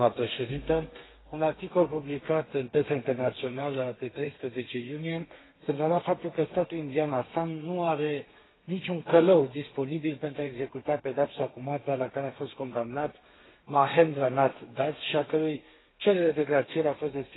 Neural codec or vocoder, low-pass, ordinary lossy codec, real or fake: codec, 32 kHz, 1.9 kbps, SNAC; 7.2 kHz; AAC, 16 kbps; fake